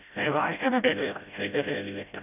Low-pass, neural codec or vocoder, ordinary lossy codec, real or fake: 3.6 kHz; codec, 16 kHz, 0.5 kbps, FreqCodec, smaller model; none; fake